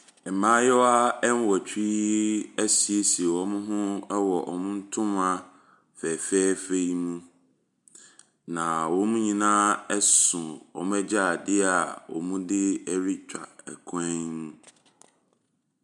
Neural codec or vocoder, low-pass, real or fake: none; 10.8 kHz; real